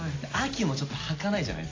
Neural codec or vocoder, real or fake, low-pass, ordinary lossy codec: none; real; 7.2 kHz; AAC, 32 kbps